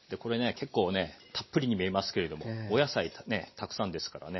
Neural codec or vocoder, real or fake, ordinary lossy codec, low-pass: none; real; MP3, 24 kbps; 7.2 kHz